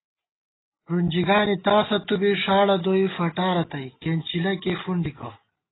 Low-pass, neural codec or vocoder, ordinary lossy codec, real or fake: 7.2 kHz; none; AAC, 16 kbps; real